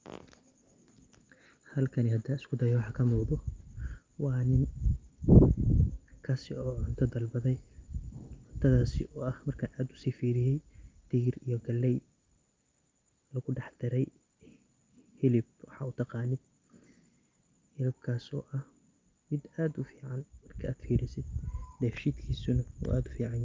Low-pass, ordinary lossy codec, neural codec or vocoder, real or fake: 7.2 kHz; Opus, 24 kbps; none; real